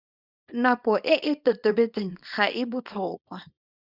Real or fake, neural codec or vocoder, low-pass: fake; codec, 24 kHz, 0.9 kbps, WavTokenizer, small release; 5.4 kHz